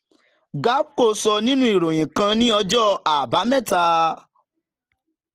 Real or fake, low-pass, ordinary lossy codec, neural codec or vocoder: real; 14.4 kHz; Opus, 16 kbps; none